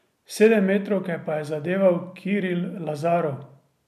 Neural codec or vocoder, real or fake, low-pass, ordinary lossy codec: none; real; 14.4 kHz; MP3, 96 kbps